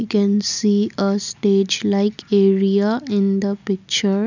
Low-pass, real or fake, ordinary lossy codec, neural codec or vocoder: 7.2 kHz; real; none; none